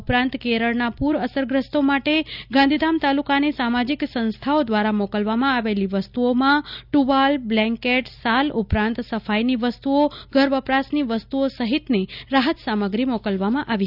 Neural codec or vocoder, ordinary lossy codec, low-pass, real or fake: none; none; 5.4 kHz; real